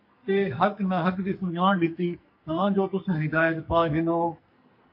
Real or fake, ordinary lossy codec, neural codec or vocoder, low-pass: fake; MP3, 32 kbps; codec, 44.1 kHz, 2.6 kbps, SNAC; 5.4 kHz